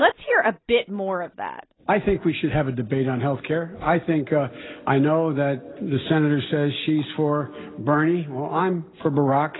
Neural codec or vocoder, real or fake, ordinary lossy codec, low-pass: none; real; AAC, 16 kbps; 7.2 kHz